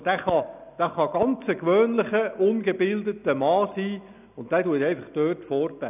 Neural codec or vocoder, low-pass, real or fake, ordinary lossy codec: none; 3.6 kHz; real; none